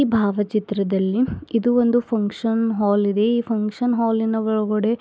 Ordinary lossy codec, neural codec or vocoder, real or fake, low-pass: none; none; real; none